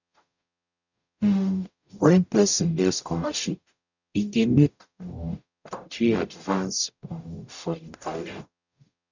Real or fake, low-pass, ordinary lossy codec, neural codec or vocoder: fake; 7.2 kHz; none; codec, 44.1 kHz, 0.9 kbps, DAC